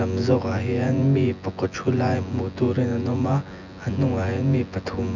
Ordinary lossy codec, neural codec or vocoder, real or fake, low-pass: none; vocoder, 24 kHz, 100 mel bands, Vocos; fake; 7.2 kHz